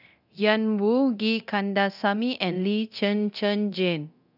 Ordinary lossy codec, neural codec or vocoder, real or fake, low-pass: none; codec, 24 kHz, 0.9 kbps, DualCodec; fake; 5.4 kHz